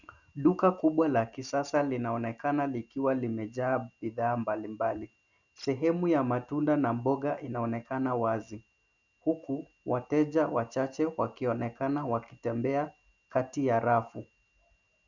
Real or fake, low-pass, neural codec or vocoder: real; 7.2 kHz; none